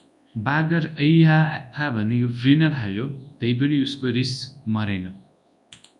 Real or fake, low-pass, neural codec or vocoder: fake; 10.8 kHz; codec, 24 kHz, 0.9 kbps, WavTokenizer, large speech release